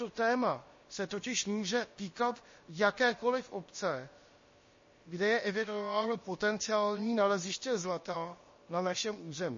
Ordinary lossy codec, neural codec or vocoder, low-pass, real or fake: MP3, 32 kbps; codec, 16 kHz, about 1 kbps, DyCAST, with the encoder's durations; 7.2 kHz; fake